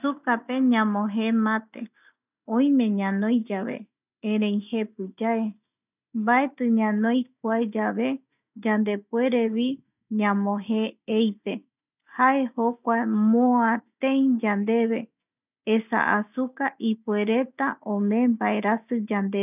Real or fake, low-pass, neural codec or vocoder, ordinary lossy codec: real; 3.6 kHz; none; none